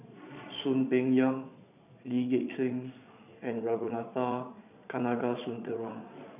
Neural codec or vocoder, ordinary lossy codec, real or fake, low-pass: vocoder, 22.05 kHz, 80 mel bands, Vocos; none; fake; 3.6 kHz